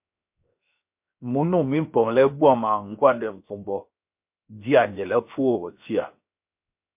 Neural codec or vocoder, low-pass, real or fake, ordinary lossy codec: codec, 16 kHz, 0.7 kbps, FocalCodec; 3.6 kHz; fake; MP3, 32 kbps